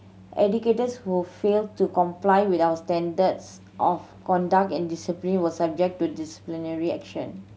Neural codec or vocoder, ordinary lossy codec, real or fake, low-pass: none; none; real; none